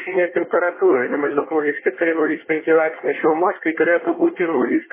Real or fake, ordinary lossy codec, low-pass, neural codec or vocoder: fake; MP3, 16 kbps; 3.6 kHz; codec, 24 kHz, 1 kbps, SNAC